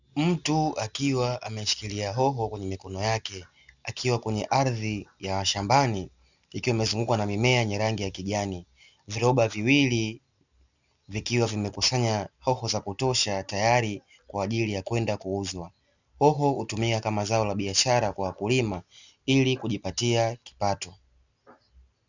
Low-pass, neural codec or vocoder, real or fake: 7.2 kHz; none; real